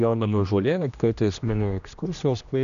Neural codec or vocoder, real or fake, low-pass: codec, 16 kHz, 1 kbps, X-Codec, HuBERT features, trained on general audio; fake; 7.2 kHz